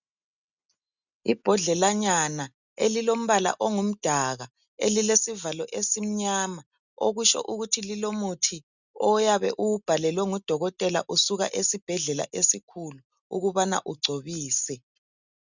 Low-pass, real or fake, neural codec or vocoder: 7.2 kHz; real; none